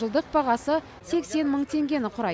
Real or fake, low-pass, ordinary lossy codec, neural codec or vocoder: real; none; none; none